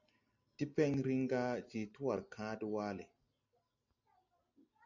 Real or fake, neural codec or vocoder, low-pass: real; none; 7.2 kHz